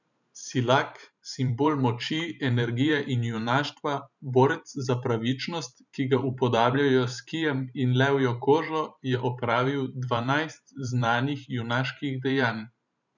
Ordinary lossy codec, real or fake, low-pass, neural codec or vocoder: none; fake; 7.2 kHz; vocoder, 44.1 kHz, 128 mel bands every 512 samples, BigVGAN v2